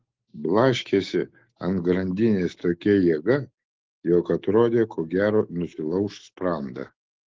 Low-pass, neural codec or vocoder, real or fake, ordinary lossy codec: 7.2 kHz; autoencoder, 48 kHz, 128 numbers a frame, DAC-VAE, trained on Japanese speech; fake; Opus, 16 kbps